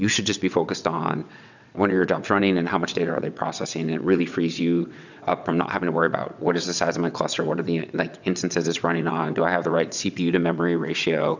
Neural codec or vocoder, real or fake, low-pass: vocoder, 22.05 kHz, 80 mel bands, WaveNeXt; fake; 7.2 kHz